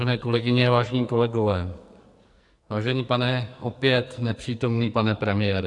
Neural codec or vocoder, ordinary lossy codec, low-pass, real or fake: codec, 44.1 kHz, 2.6 kbps, SNAC; AAC, 64 kbps; 10.8 kHz; fake